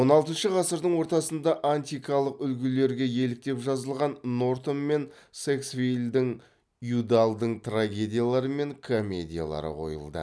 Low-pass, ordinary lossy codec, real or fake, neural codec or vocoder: none; none; real; none